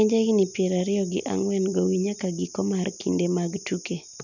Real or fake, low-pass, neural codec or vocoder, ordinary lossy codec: real; 7.2 kHz; none; none